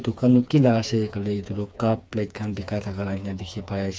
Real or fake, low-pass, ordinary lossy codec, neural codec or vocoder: fake; none; none; codec, 16 kHz, 4 kbps, FreqCodec, smaller model